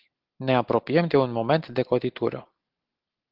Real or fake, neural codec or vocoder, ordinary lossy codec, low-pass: real; none; Opus, 16 kbps; 5.4 kHz